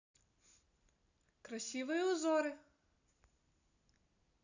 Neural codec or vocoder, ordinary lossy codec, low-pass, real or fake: none; none; 7.2 kHz; real